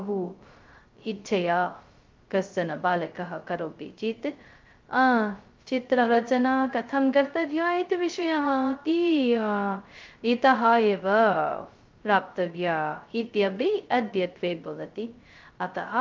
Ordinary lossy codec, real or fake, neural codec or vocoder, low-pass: Opus, 24 kbps; fake; codec, 16 kHz, 0.2 kbps, FocalCodec; 7.2 kHz